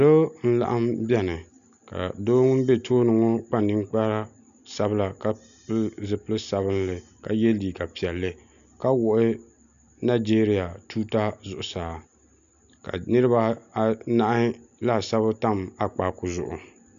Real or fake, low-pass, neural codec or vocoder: real; 7.2 kHz; none